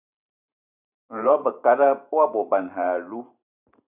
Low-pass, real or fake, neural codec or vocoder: 3.6 kHz; fake; vocoder, 44.1 kHz, 128 mel bands every 512 samples, BigVGAN v2